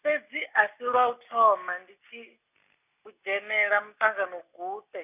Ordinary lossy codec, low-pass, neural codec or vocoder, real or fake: none; 3.6 kHz; none; real